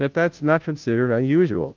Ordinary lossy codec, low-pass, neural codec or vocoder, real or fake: Opus, 32 kbps; 7.2 kHz; codec, 16 kHz, 0.5 kbps, FunCodec, trained on Chinese and English, 25 frames a second; fake